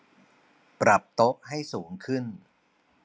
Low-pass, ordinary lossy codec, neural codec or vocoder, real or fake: none; none; none; real